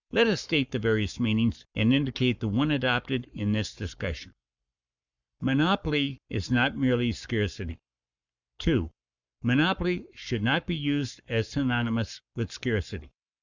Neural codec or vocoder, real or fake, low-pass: codec, 44.1 kHz, 7.8 kbps, Pupu-Codec; fake; 7.2 kHz